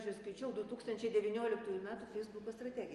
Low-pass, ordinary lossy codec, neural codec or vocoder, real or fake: 10.8 kHz; Opus, 32 kbps; none; real